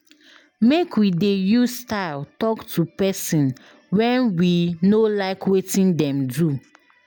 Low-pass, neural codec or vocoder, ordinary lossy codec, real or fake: none; none; none; real